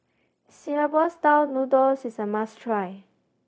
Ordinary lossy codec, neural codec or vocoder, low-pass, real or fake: none; codec, 16 kHz, 0.4 kbps, LongCat-Audio-Codec; none; fake